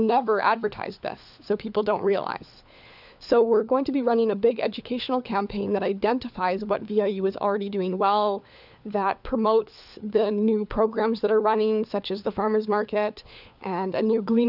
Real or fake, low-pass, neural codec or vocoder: fake; 5.4 kHz; codec, 16 kHz, 4 kbps, FunCodec, trained on LibriTTS, 50 frames a second